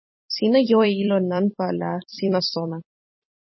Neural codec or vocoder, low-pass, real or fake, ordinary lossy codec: vocoder, 44.1 kHz, 128 mel bands every 256 samples, BigVGAN v2; 7.2 kHz; fake; MP3, 24 kbps